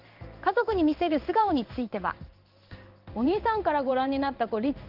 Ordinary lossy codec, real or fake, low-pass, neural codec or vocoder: Opus, 24 kbps; fake; 5.4 kHz; codec, 16 kHz in and 24 kHz out, 1 kbps, XY-Tokenizer